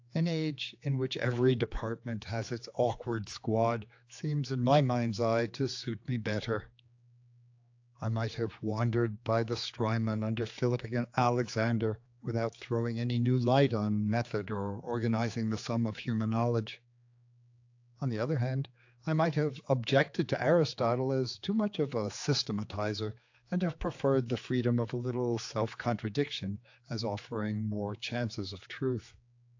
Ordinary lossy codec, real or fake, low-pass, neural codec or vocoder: AAC, 48 kbps; fake; 7.2 kHz; codec, 16 kHz, 4 kbps, X-Codec, HuBERT features, trained on general audio